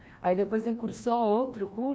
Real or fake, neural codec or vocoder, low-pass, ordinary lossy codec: fake; codec, 16 kHz, 1 kbps, FreqCodec, larger model; none; none